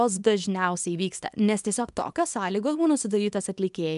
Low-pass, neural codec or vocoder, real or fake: 10.8 kHz; codec, 24 kHz, 0.9 kbps, WavTokenizer, small release; fake